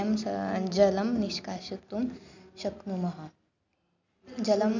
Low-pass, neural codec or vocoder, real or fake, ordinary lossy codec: 7.2 kHz; none; real; none